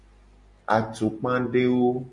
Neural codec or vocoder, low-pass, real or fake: none; 10.8 kHz; real